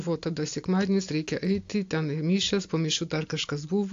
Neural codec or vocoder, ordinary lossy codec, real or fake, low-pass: none; AAC, 48 kbps; real; 7.2 kHz